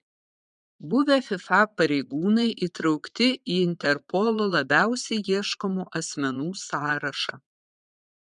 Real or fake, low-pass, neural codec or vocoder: fake; 9.9 kHz; vocoder, 22.05 kHz, 80 mel bands, Vocos